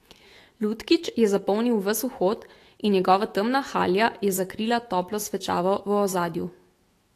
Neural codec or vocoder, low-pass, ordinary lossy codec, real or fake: autoencoder, 48 kHz, 128 numbers a frame, DAC-VAE, trained on Japanese speech; 14.4 kHz; AAC, 48 kbps; fake